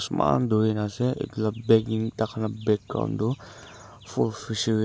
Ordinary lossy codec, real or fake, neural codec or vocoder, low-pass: none; real; none; none